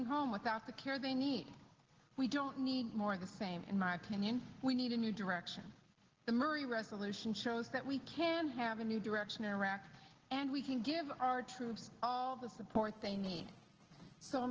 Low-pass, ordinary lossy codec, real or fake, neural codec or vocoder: 7.2 kHz; Opus, 16 kbps; real; none